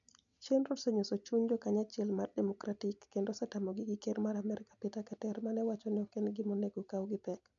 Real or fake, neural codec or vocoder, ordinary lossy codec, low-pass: real; none; none; 7.2 kHz